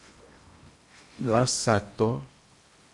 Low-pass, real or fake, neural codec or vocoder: 10.8 kHz; fake; codec, 16 kHz in and 24 kHz out, 0.8 kbps, FocalCodec, streaming, 65536 codes